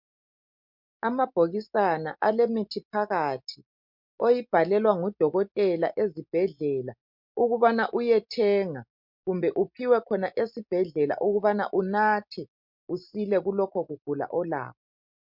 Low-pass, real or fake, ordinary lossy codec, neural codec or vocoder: 5.4 kHz; real; MP3, 48 kbps; none